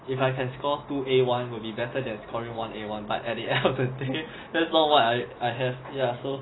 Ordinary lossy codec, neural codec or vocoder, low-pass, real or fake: AAC, 16 kbps; none; 7.2 kHz; real